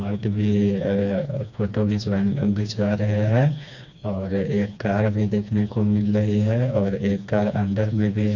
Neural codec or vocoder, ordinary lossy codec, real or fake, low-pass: codec, 16 kHz, 2 kbps, FreqCodec, smaller model; none; fake; 7.2 kHz